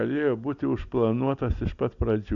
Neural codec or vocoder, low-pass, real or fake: none; 7.2 kHz; real